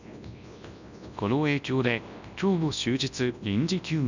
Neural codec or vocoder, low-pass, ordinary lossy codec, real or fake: codec, 24 kHz, 0.9 kbps, WavTokenizer, large speech release; 7.2 kHz; none; fake